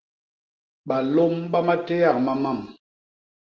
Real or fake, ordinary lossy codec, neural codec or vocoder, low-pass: real; Opus, 16 kbps; none; 7.2 kHz